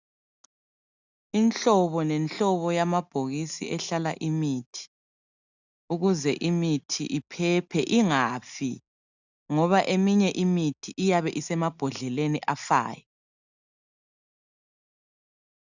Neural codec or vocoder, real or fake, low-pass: none; real; 7.2 kHz